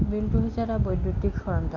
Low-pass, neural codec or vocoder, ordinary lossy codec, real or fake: 7.2 kHz; none; MP3, 64 kbps; real